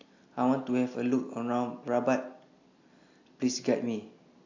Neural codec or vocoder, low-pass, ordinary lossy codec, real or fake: none; 7.2 kHz; AAC, 48 kbps; real